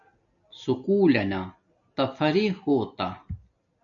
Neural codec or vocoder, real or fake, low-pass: none; real; 7.2 kHz